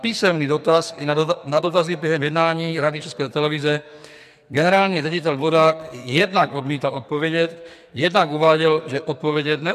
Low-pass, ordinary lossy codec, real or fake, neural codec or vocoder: 14.4 kHz; MP3, 96 kbps; fake; codec, 44.1 kHz, 2.6 kbps, SNAC